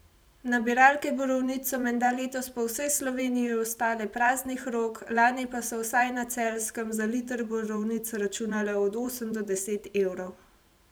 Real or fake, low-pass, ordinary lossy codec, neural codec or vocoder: fake; none; none; vocoder, 44.1 kHz, 128 mel bands every 512 samples, BigVGAN v2